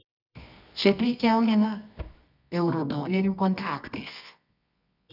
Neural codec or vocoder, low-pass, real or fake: codec, 24 kHz, 0.9 kbps, WavTokenizer, medium music audio release; 5.4 kHz; fake